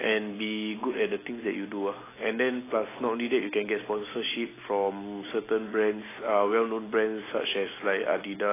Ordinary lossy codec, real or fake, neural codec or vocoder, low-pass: AAC, 16 kbps; real; none; 3.6 kHz